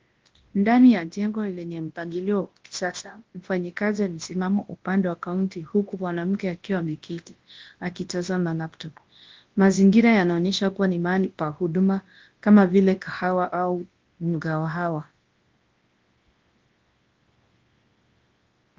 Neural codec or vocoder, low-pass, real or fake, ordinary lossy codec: codec, 24 kHz, 0.9 kbps, WavTokenizer, large speech release; 7.2 kHz; fake; Opus, 16 kbps